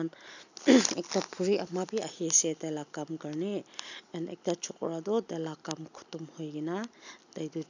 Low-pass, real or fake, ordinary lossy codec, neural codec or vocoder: 7.2 kHz; real; none; none